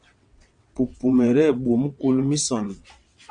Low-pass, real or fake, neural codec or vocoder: 9.9 kHz; fake; vocoder, 22.05 kHz, 80 mel bands, WaveNeXt